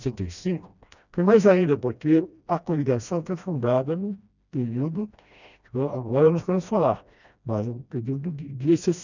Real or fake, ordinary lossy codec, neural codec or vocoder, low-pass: fake; none; codec, 16 kHz, 1 kbps, FreqCodec, smaller model; 7.2 kHz